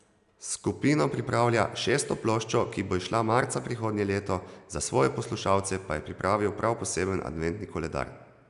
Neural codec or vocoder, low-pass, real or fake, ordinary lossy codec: none; 10.8 kHz; real; none